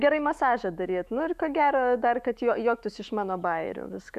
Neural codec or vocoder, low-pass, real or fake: autoencoder, 48 kHz, 128 numbers a frame, DAC-VAE, trained on Japanese speech; 14.4 kHz; fake